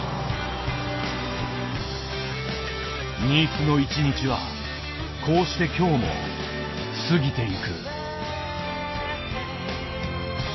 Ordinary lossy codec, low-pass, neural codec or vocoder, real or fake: MP3, 24 kbps; 7.2 kHz; none; real